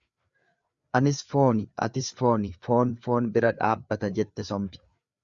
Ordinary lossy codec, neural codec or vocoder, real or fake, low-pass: Opus, 64 kbps; codec, 16 kHz, 4 kbps, FreqCodec, larger model; fake; 7.2 kHz